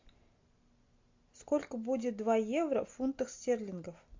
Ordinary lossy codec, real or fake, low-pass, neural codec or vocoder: MP3, 48 kbps; real; 7.2 kHz; none